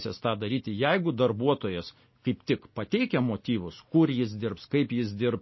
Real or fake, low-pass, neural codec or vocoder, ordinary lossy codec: real; 7.2 kHz; none; MP3, 24 kbps